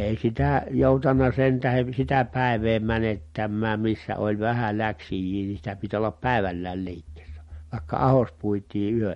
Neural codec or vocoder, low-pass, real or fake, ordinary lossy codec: none; 14.4 kHz; real; MP3, 48 kbps